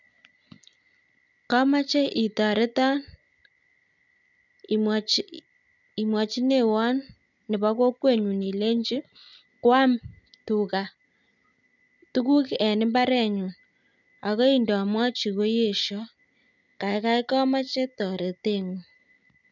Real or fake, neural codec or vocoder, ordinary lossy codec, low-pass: real; none; none; 7.2 kHz